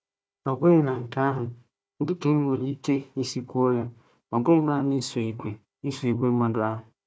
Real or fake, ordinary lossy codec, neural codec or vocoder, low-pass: fake; none; codec, 16 kHz, 1 kbps, FunCodec, trained on Chinese and English, 50 frames a second; none